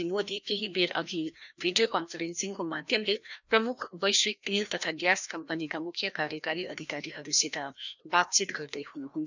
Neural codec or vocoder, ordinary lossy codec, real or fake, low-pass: codec, 16 kHz, 1 kbps, FreqCodec, larger model; none; fake; 7.2 kHz